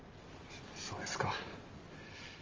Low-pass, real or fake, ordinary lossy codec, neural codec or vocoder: 7.2 kHz; real; Opus, 32 kbps; none